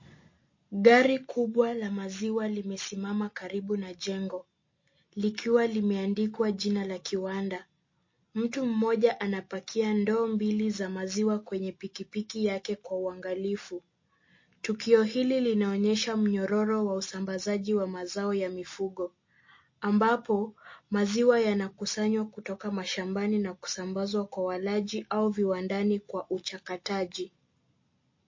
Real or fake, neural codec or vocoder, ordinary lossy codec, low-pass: real; none; MP3, 32 kbps; 7.2 kHz